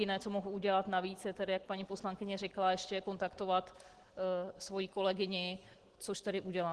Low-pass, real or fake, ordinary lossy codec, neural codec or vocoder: 10.8 kHz; real; Opus, 16 kbps; none